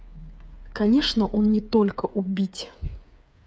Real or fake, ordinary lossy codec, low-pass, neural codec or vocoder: fake; none; none; codec, 16 kHz, 8 kbps, FreqCodec, smaller model